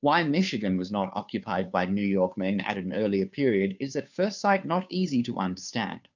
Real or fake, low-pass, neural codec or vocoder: fake; 7.2 kHz; codec, 16 kHz, 2 kbps, FunCodec, trained on Chinese and English, 25 frames a second